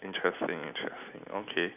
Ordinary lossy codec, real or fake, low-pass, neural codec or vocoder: none; real; 3.6 kHz; none